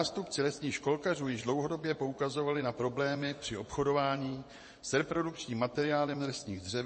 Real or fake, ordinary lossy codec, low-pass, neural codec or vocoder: fake; MP3, 32 kbps; 9.9 kHz; vocoder, 24 kHz, 100 mel bands, Vocos